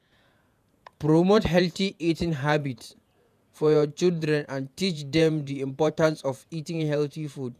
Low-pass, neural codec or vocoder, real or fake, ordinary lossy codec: 14.4 kHz; vocoder, 48 kHz, 128 mel bands, Vocos; fake; none